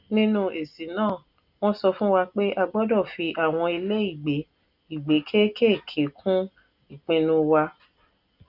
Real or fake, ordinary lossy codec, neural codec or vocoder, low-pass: real; none; none; 5.4 kHz